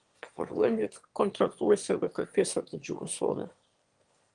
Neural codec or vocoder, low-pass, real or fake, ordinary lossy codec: autoencoder, 22.05 kHz, a latent of 192 numbers a frame, VITS, trained on one speaker; 9.9 kHz; fake; Opus, 24 kbps